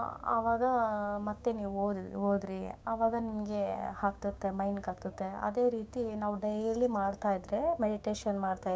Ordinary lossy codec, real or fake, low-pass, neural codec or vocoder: none; fake; none; codec, 16 kHz, 6 kbps, DAC